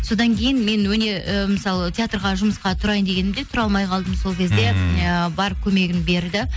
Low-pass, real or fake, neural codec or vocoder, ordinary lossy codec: none; real; none; none